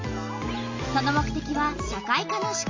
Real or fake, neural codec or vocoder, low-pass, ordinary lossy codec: real; none; 7.2 kHz; MP3, 64 kbps